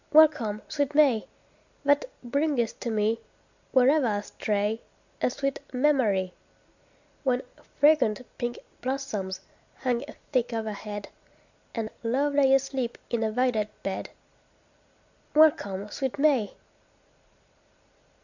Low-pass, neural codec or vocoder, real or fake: 7.2 kHz; none; real